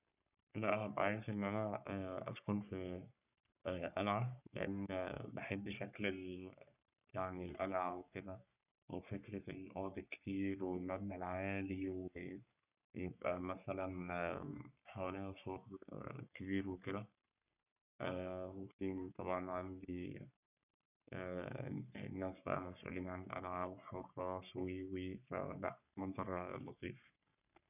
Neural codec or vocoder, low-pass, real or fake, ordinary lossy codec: codec, 44.1 kHz, 3.4 kbps, Pupu-Codec; 3.6 kHz; fake; none